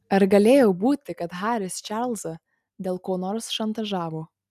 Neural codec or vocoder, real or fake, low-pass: none; real; 14.4 kHz